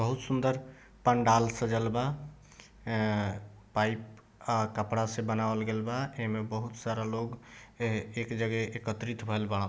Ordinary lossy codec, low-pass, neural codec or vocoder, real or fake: none; none; none; real